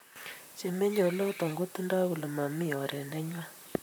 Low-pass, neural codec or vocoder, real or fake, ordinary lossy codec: none; vocoder, 44.1 kHz, 128 mel bands, Pupu-Vocoder; fake; none